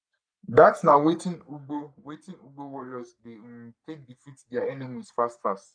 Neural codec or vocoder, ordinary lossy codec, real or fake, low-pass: codec, 44.1 kHz, 3.4 kbps, Pupu-Codec; none; fake; 9.9 kHz